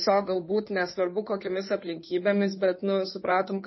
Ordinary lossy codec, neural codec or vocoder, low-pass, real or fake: MP3, 24 kbps; codec, 16 kHz in and 24 kHz out, 2.2 kbps, FireRedTTS-2 codec; 7.2 kHz; fake